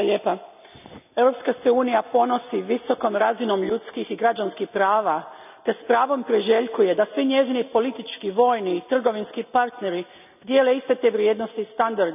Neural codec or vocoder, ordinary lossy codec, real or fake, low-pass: none; none; real; 3.6 kHz